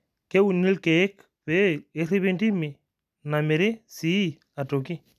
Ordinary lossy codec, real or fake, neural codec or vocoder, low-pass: none; real; none; 14.4 kHz